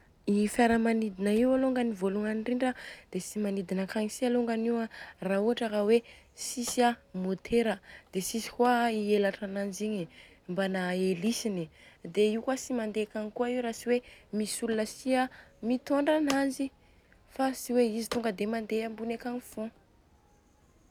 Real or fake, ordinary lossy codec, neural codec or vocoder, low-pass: real; none; none; 19.8 kHz